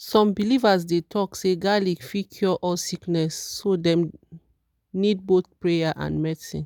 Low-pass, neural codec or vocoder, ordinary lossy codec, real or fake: 19.8 kHz; none; none; real